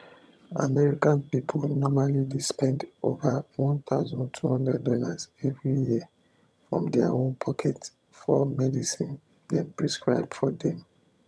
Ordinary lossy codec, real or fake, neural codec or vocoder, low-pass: none; fake; vocoder, 22.05 kHz, 80 mel bands, HiFi-GAN; none